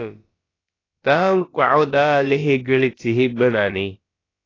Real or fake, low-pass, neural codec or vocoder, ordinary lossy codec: fake; 7.2 kHz; codec, 16 kHz, about 1 kbps, DyCAST, with the encoder's durations; AAC, 32 kbps